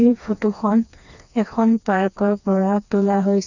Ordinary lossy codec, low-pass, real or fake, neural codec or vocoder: none; 7.2 kHz; fake; codec, 16 kHz, 2 kbps, FreqCodec, smaller model